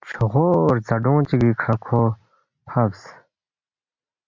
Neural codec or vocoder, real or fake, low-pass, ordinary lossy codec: none; real; 7.2 kHz; MP3, 48 kbps